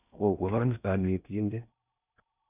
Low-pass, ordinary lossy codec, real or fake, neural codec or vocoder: 3.6 kHz; none; fake; codec, 16 kHz in and 24 kHz out, 0.6 kbps, FocalCodec, streaming, 4096 codes